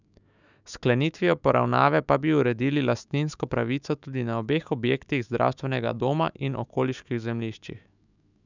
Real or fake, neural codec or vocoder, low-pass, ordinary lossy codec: fake; autoencoder, 48 kHz, 128 numbers a frame, DAC-VAE, trained on Japanese speech; 7.2 kHz; none